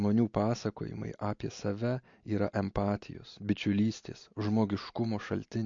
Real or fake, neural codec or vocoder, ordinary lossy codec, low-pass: real; none; MP3, 48 kbps; 7.2 kHz